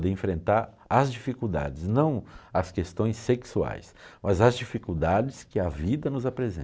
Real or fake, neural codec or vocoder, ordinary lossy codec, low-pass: real; none; none; none